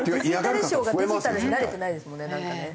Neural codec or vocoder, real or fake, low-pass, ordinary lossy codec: none; real; none; none